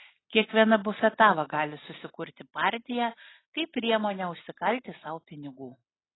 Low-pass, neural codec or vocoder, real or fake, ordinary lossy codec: 7.2 kHz; none; real; AAC, 16 kbps